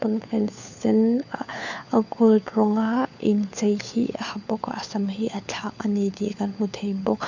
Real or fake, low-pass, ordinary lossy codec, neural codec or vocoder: fake; 7.2 kHz; none; codec, 16 kHz, 4 kbps, FunCodec, trained on LibriTTS, 50 frames a second